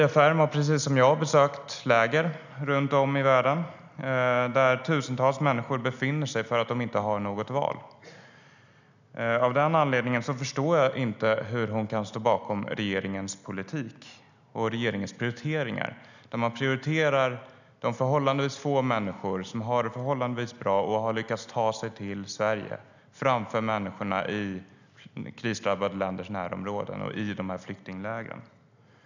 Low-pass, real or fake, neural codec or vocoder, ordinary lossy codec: 7.2 kHz; real; none; none